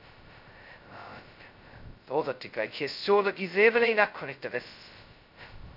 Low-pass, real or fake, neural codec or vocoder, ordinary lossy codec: 5.4 kHz; fake; codec, 16 kHz, 0.2 kbps, FocalCodec; none